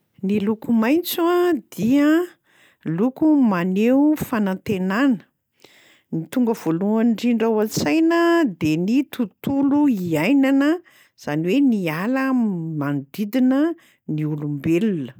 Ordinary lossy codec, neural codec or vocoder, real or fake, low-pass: none; none; real; none